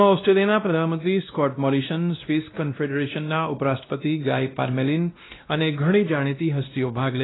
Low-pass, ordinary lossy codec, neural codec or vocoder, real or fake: 7.2 kHz; AAC, 16 kbps; codec, 16 kHz, 1 kbps, X-Codec, WavLM features, trained on Multilingual LibriSpeech; fake